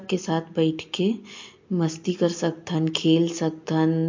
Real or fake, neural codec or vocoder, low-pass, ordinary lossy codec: real; none; 7.2 kHz; MP3, 48 kbps